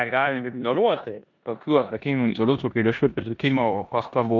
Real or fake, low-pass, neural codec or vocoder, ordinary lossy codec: fake; 7.2 kHz; codec, 16 kHz in and 24 kHz out, 0.9 kbps, LongCat-Audio-Codec, four codebook decoder; MP3, 64 kbps